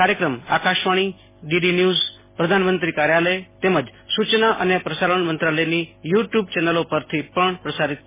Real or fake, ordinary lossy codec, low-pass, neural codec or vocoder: real; MP3, 16 kbps; 3.6 kHz; none